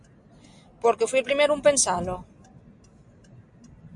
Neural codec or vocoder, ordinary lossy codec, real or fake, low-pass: none; MP3, 64 kbps; real; 10.8 kHz